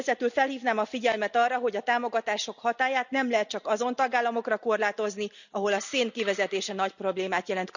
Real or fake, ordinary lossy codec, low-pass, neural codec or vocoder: real; none; 7.2 kHz; none